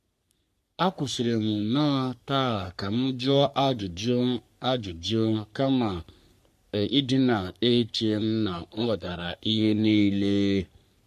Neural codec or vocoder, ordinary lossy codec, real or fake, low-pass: codec, 44.1 kHz, 3.4 kbps, Pupu-Codec; MP3, 64 kbps; fake; 14.4 kHz